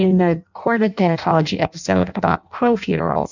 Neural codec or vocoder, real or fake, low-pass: codec, 16 kHz in and 24 kHz out, 0.6 kbps, FireRedTTS-2 codec; fake; 7.2 kHz